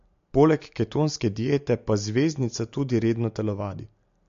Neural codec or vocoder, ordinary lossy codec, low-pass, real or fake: none; MP3, 48 kbps; 7.2 kHz; real